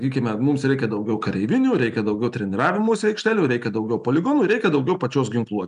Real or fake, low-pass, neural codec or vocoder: real; 10.8 kHz; none